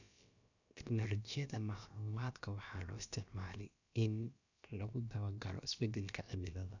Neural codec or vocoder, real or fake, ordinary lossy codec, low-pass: codec, 16 kHz, about 1 kbps, DyCAST, with the encoder's durations; fake; none; 7.2 kHz